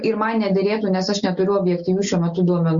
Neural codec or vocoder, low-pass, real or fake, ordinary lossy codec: none; 7.2 kHz; real; Opus, 64 kbps